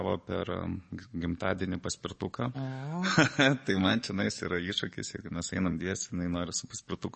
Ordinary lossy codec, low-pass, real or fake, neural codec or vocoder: MP3, 32 kbps; 10.8 kHz; fake; codec, 44.1 kHz, 7.8 kbps, DAC